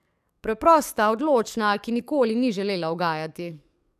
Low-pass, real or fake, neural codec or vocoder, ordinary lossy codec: 14.4 kHz; fake; codec, 44.1 kHz, 7.8 kbps, DAC; none